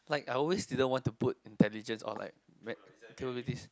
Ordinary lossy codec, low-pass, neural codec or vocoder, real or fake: none; none; none; real